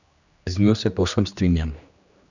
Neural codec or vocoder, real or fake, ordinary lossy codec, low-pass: codec, 16 kHz, 2 kbps, X-Codec, HuBERT features, trained on general audio; fake; none; 7.2 kHz